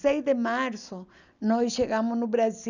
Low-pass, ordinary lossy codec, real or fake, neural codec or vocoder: 7.2 kHz; none; real; none